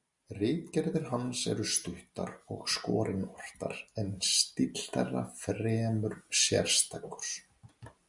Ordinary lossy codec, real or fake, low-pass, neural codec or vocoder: Opus, 64 kbps; real; 10.8 kHz; none